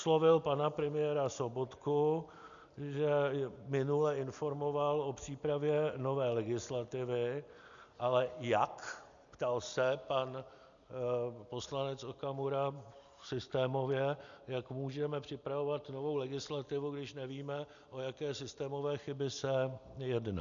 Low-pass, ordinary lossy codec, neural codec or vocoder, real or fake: 7.2 kHz; MP3, 96 kbps; none; real